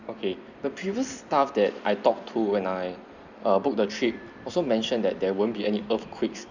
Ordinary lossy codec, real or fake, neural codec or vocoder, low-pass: none; fake; vocoder, 44.1 kHz, 128 mel bands every 256 samples, BigVGAN v2; 7.2 kHz